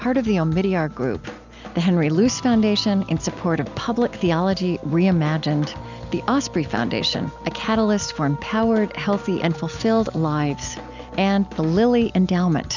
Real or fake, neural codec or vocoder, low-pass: real; none; 7.2 kHz